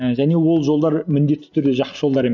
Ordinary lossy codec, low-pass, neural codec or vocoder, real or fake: none; 7.2 kHz; none; real